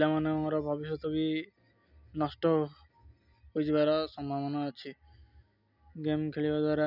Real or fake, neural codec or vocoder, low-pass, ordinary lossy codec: real; none; 5.4 kHz; none